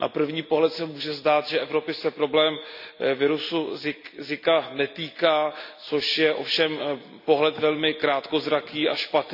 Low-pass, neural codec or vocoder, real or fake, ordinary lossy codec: 5.4 kHz; none; real; none